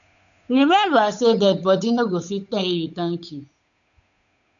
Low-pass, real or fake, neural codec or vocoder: 7.2 kHz; fake; codec, 16 kHz, 8 kbps, FunCodec, trained on Chinese and English, 25 frames a second